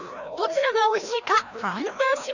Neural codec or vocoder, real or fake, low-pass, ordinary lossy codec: codec, 16 kHz, 1 kbps, FreqCodec, larger model; fake; 7.2 kHz; none